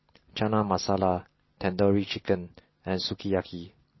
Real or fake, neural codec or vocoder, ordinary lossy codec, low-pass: real; none; MP3, 24 kbps; 7.2 kHz